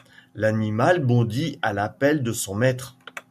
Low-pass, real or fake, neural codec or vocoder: 14.4 kHz; real; none